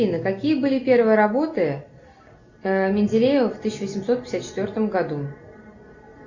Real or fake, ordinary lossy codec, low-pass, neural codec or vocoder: real; Opus, 64 kbps; 7.2 kHz; none